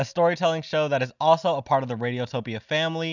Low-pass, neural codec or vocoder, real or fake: 7.2 kHz; none; real